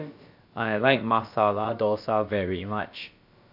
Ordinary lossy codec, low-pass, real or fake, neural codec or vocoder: MP3, 48 kbps; 5.4 kHz; fake; codec, 16 kHz, about 1 kbps, DyCAST, with the encoder's durations